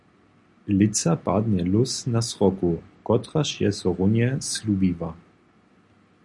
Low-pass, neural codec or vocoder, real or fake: 10.8 kHz; none; real